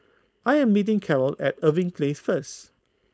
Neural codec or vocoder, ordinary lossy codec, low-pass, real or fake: codec, 16 kHz, 4.8 kbps, FACodec; none; none; fake